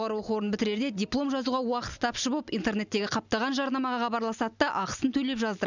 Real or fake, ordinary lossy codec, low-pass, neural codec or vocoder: real; none; 7.2 kHz; none